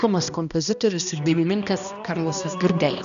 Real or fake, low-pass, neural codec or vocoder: fake; 7.2 kHz; codec, 16 kHz, 1 kbps, X-Codec, HuBERT features, trained on balanced general audio